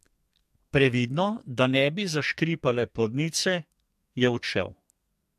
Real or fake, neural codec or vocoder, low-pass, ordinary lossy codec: fake; codec, 44.1 kHz, 2.6 kbps, SNAC; 14.4 kHz; MP3, 64 kbps